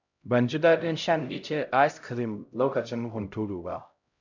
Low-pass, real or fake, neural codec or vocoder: 7.2 kHz; fake; codec, 16 kHz, 0.5 kbps, X-Codec, HuBERT features, trained on LibriSpeech